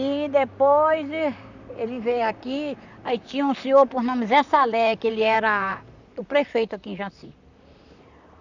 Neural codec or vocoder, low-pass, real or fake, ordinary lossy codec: vocoder, 44.1 kHz, 128 mel bands, Pupu-Vocoder; 7.2 kHz; fake; none